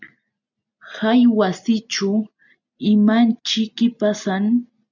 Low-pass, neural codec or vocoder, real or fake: 7.2 kHz; none; real